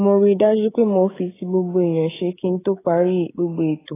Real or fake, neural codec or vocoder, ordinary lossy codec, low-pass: fake; codec, 16 kHz, 16 kbps, FreqCodec, smaller model; AAC, 16 kbps; 3.6 kHz